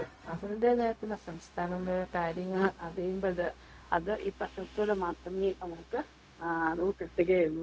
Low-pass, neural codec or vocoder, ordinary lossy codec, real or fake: none; codec, 16 kHz, 0.4 kbps, LongCat-Audio-Codec; none; fake